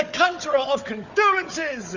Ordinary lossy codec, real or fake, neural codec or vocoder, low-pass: Opus, 64 kbps; fake; codec, 16 kHz, 4 kbps, FunCodec, trained on Chinese and English, 50 frames a second; 7.2 kHz